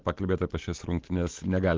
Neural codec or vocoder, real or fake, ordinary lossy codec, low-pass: none; real; Opus, 16 kbps; 7.2 kHz